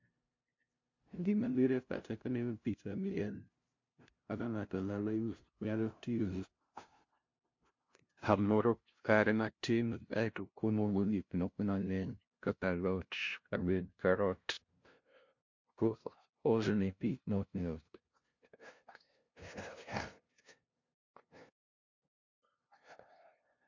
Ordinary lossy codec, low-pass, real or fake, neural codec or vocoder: MP3, 48 kbps; 7.2 kHz; fake; codec, 16 kHz, 0.5 kbps, FunCodec, trained on LibriTTS, 25 frames a second